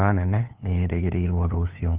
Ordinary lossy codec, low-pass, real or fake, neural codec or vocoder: Opus, 32 kbps; 3.6 kHz; fake; codec, 16 kHz, 2 kbps, FunCodec, trained on LibriTTS, 25 frames a second